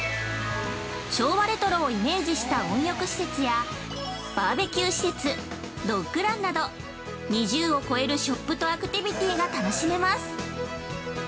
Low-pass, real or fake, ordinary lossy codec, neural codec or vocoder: none; real; none; none